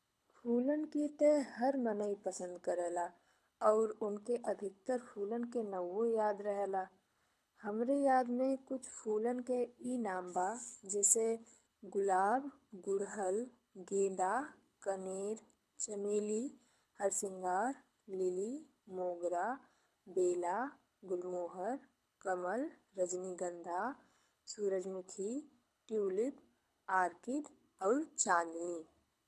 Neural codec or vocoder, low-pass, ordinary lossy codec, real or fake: codec, 24 kHz, 6 kbps, HILCodec; none; none; fake